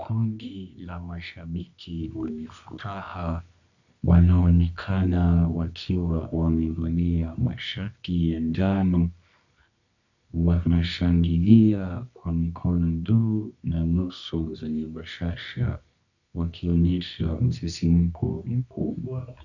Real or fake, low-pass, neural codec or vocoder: fake; 7.2 kHz; codec, 24 kHz, 0.9 kbps, WavTokenizer, medium music audio release